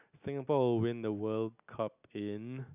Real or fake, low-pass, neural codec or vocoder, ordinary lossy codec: real; 3.6 kHz; none; none